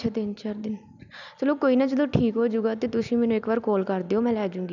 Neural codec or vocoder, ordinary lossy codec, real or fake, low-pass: none; none; real; 7.2 kHz